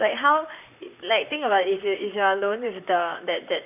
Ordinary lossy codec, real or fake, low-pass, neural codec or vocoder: none; fake; 3.6 kHz; vocoder, 44.1 kHz, 128 mel bands, Pupu-Vocoder